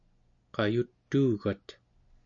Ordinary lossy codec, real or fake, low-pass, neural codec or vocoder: AAC, 64 kbps; real; 7.2 kHz; none